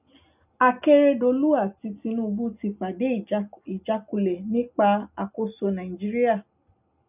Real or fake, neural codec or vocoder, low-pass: real; none; 3.6 kHz